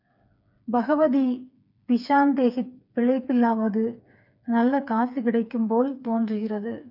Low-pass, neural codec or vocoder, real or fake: 5.4 kHz; codec, 16 kHz, 4 kbps, FreqCodec, smaller model; fake